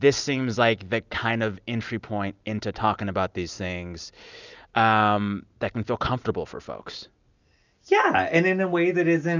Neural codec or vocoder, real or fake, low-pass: none; real; 7.2 kHz